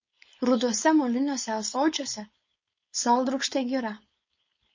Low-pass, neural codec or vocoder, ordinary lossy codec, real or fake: 7.2 kHz; codec, 16 kHz, 4.8 kbps, FACodec; MP3, 32 kbps; fake